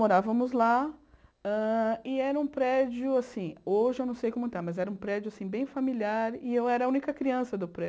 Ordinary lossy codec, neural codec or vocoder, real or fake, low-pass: none; none; real; none